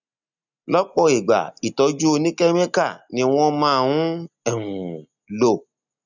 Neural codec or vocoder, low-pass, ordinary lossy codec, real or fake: none; 7.2 kHz; none; real